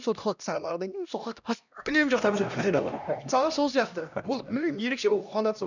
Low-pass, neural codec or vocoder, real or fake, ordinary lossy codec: 7.2 kHz; codec, 16 kHz, 1 kbps, X-Codec, HuBERT features, trained on LibriSpeech; fake; none